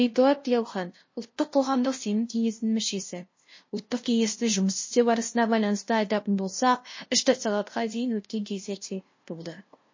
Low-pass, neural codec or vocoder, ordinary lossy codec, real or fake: 7.2 kHz; codec, 16 kHz, 0.5 kbps, FunCodec, trained on LibriTTS, 25 frames a second; MP3, 32 kbps; fake